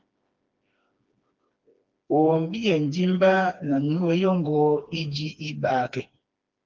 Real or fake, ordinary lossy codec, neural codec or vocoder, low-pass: fake; Opus, 32 kbps; codec, 16 kHz, 2 kbps, FreqCodec, smaller model; 7.2 kHz